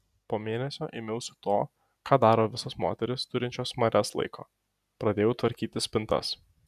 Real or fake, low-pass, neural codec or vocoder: real; 14.4 kHz; none